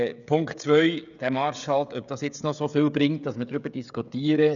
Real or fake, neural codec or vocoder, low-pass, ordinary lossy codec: fake; codec, 16 kHz, 16 kbps, FreqCodec, smaller model; 7.2 kHz; none